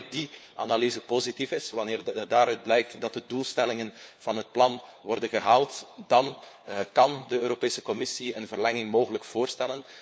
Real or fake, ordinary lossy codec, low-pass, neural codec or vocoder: fake; none; none; codec, 16 kHz, 4 kbps, FunCodec, trained on LibriTTS, 50 frames a second